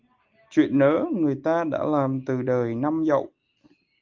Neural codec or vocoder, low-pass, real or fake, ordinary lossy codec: none; 7.2 kHz; real; Opus, 32 kbps